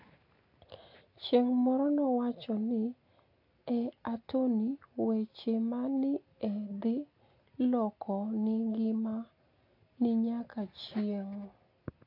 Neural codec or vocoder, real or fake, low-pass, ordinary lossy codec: none; real; 5.4 kHz; AAC, 32 kbps